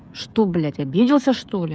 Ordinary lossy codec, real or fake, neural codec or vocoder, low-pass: none; fake; codec, 16 kHz, 16 kbps, FreqCodec, smaller model; none